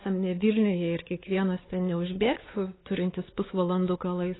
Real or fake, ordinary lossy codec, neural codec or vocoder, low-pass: fake; AAC, 16 kbps; codec, 16 kHz, 4 kbps, X-Codec, WavLM features, trained on Multilingual LibriSpeech; 7.2 kHz